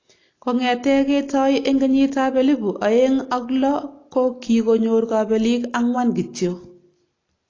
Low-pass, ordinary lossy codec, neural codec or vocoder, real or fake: 7.2 kHz; AAC, 32 kbps; none; real